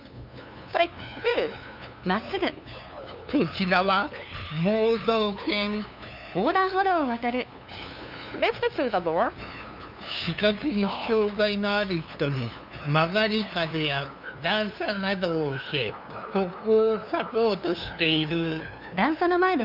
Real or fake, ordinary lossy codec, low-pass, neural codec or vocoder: fake; none; 5.4 kHz; codec, 16 kHz, 2 kbps, FunCodec, trained on LibriTTS, 25 frames a second